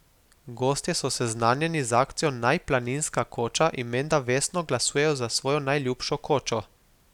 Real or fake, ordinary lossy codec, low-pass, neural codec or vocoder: real; none; 19.8 kHz; none